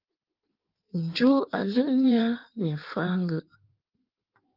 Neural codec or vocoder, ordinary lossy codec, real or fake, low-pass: codec, 16 kHz in and 24 kHz out, 1.1 kbps, FireRedTTS-2 codec; Opus, 24 kbps; fake; 5.4 kHz